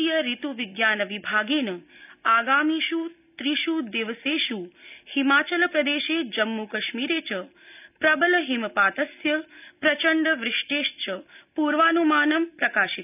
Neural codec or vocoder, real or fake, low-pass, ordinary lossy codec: none; real; 3.6 kHz; none